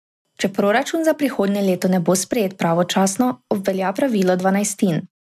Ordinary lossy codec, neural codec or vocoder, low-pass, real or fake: MP3, 96 kbps; none; 14.4 kHz; real